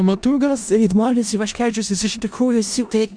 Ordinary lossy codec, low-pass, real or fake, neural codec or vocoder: Opus, 64 kbps; 9.9 kHz; fake; codec, 16 kHz in and 24 kHz out, 0.4 kbps, LongCat-Audio-Codec, four codebook decoder